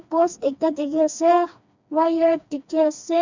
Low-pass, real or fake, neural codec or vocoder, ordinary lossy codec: 7.2 kHz; fake; codec, 16 kHz, 2 kbps, FreqCodec, smaller model; MP3, 64 kbps